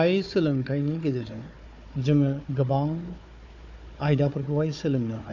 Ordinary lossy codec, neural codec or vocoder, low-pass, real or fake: none; codec, 16 kHz, 4 kbps, FunCodec, trained on Chinese and English, 50 frames a second; 7.2 kHz; fake